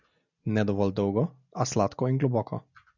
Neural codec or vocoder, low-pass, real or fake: none; 7.2 kHz; real